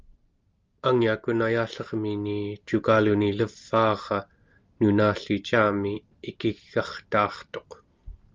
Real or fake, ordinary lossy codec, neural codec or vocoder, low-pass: real; Opus, 24 kbps; none; 7.2 kHz